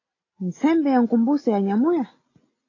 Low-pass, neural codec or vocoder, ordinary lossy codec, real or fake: 7.2 kHz; none; AAC, 32 kbps; real